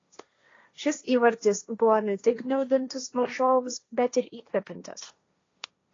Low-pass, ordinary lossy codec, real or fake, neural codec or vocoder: 7.2 kHz; AAC, 32 kbps; fake; codec, 16 kHz, 1.1 kbps, Voila-Tokenizer